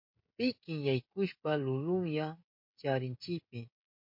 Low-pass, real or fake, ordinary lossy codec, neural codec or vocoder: 5.4 kHz; fake; MP3, 32 kbps; codec, 16 kHz, 16 kbps, FreqCodec, smaller model